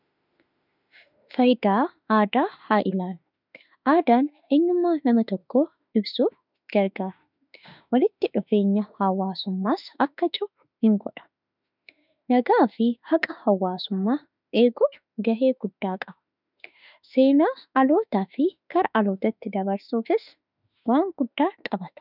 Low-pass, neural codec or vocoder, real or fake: 5.4 kHz; autoencoder, 48 kHz, 32 numbers a frame, DAC-VAE, trained on Japanese speech; fake